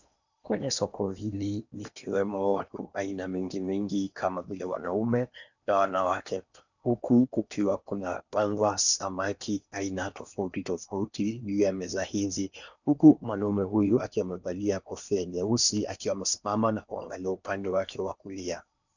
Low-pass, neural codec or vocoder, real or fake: 7.2 kHz; codec, 16 kHz in and 24 kHz out, 0.8 kbps, FocalCodec, streaming, 65536 codes; fake